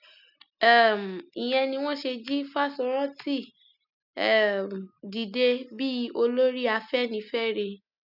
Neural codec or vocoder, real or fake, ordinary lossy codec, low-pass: none; real; none; 5.4 kHz